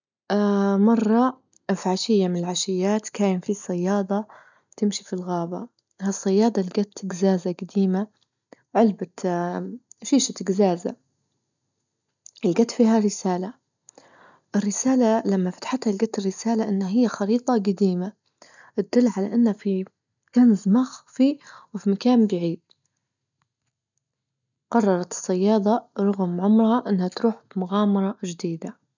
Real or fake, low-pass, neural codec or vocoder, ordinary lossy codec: real; 7.2 kHz; none; none